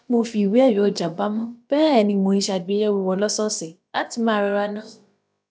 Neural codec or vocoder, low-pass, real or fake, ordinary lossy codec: codec, 16 kHz, about 1 kbps, DyCAST, with the encoder's durations; none; fake; none